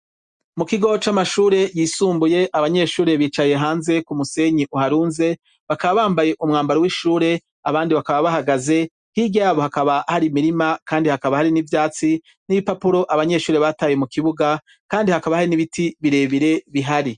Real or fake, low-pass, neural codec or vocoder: real; 9.9 kHz; none